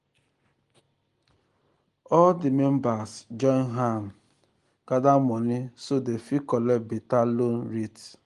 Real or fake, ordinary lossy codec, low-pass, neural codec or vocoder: real; Opus, 24 kbps; 10.8 kHz; none